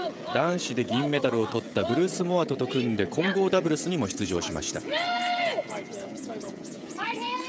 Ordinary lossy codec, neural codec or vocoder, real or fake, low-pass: none; codec, 16 kHz, 16 kbps, FreqCodec, smaller model; fake; none